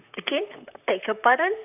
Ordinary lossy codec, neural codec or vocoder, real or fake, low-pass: none; vocoder, 44.1 kHz, 128 mel bands, Pupu-Vocoder; fake; 3.6 kHz